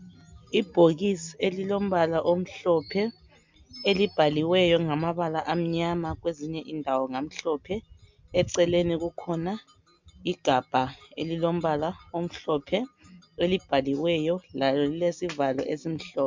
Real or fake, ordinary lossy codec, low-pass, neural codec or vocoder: real; MP3, 64 kbps; 7.2 kHz; none